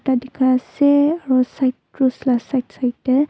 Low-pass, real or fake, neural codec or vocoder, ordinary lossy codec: none; real; none; none